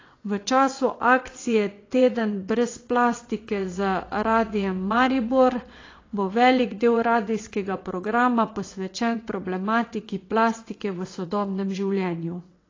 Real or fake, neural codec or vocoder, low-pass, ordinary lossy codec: fake; vocoder, 22.05 kHz, 80 mel bands, WaveNeXt; 7.2 kHz; AAC, 32 kbps